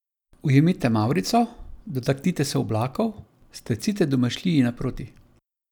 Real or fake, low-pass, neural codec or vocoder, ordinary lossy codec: real; 19.8 kHz; none; none